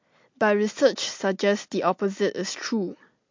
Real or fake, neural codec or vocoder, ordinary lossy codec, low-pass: real; none; MP3, 48 kbps; 7.2 kHz